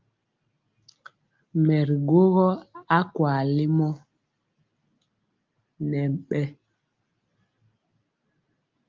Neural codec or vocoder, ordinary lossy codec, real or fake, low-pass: none; Opus, 32 kbps; real; 7.2 kHz